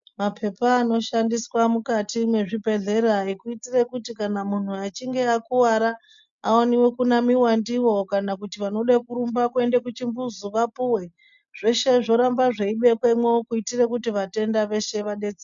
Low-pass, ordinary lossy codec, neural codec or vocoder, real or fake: 7.2 kHz; MP3, 64 kbps; none; real